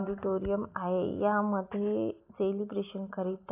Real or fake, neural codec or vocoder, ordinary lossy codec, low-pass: real; none; AAC, 24 kbps; 3.6 kHz